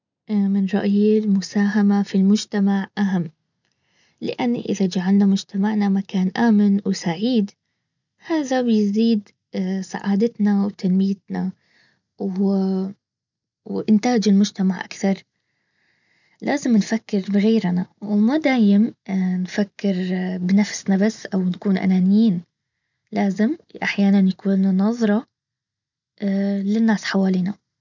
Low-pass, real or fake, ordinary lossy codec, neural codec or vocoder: 7.2 kHz; real; none; none